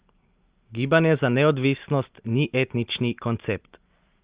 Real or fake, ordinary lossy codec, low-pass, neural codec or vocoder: real; Opus, 32 kbps; 3.6 kHz; none